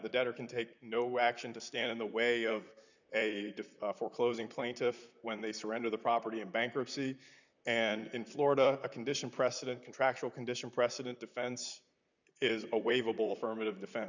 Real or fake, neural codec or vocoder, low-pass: fake; vocoder, 44.1 kHz, 128 mel bands, Pupu-Vocoder; 7.2 kHz